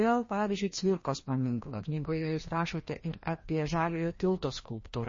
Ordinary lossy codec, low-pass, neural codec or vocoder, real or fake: MP3, 32 kbps; 7.2 kHz; codec, 16 kHz, 1 kbps, FreqCodec, larger model; fake